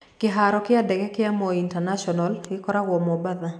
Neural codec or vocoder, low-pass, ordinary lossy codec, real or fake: none; none; none; real